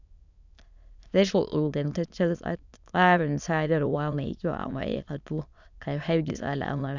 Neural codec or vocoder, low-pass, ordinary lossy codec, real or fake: autoencoder, 22.05 kHz, a latent of 192 numbers a frame, VITS, trained on many speakers; 7.2 kHz; none; fake